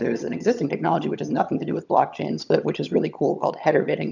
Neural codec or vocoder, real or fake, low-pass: vocoder, 22.05 kHz, 80 mel bands, HiFi-GAN; fake; 7.2 kHz